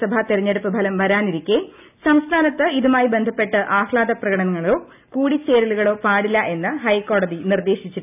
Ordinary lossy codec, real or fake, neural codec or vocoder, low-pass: none; real; none; 3.6 kHz